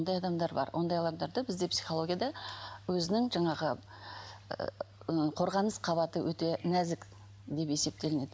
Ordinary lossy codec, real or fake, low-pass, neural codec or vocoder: none; real; none; none